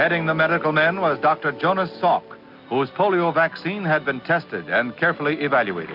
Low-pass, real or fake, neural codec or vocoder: 5.4 kHz; real; none